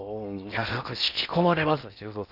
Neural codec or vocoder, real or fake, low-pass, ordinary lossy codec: codec, 16 kHz in and 24 kHz out, 0.6 kbps, FocalCodec, streaming, 4096 codes; fake; 5.4 kHz; none